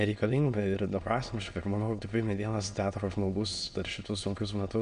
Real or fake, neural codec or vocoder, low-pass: fake; autoencoder, 22.05 kHz, a latent of 192 numbers a frame, VITS, trained on many speakers; 9.9 kHz